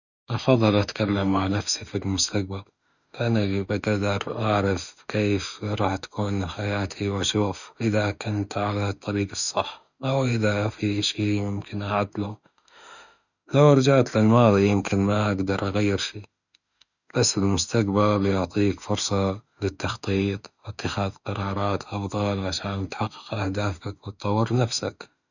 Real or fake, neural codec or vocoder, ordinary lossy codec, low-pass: fake; autoencoder, 48 kHz, 32 numbers a frame, DAC-VAE, trained on Japanese speech; none; 7.2 kHz